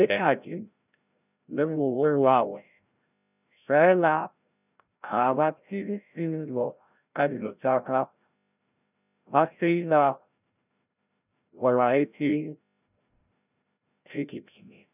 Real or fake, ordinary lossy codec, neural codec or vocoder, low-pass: fake; none; codec, 16 kHz, 0.5 kbps, FreqCodec, larger model; 3.6 kHz